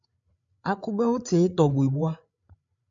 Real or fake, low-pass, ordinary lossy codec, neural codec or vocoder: fake; 7.2 kHz; MP3, 64 kbps; codec, 16 kHz, 8 kbps, FreqCodec, larger model